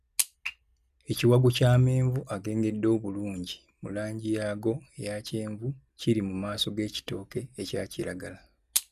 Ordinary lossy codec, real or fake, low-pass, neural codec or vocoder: none; real; 14.4 kHz; none